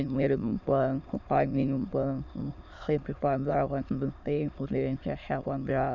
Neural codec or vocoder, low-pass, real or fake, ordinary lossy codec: autoencoder, 22.05 kHz, a latent of 192 numbers a frame, VITS, trained on many speakers; 7.2 kHz; fake; none